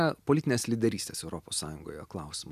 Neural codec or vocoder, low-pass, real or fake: none; 14.4 kHz; real